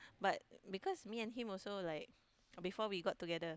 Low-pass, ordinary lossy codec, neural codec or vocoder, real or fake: none; none; none; real